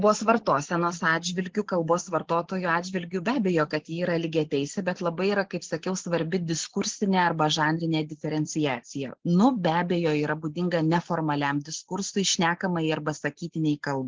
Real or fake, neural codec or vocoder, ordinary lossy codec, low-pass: real; none; Opus, 16 kbps; 7.2 kHz